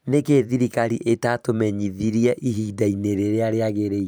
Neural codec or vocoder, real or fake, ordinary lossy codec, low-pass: vocoder, 44.1 kHz, 128 mel bands every 512 samples, BigVGAN v2; fake; none; none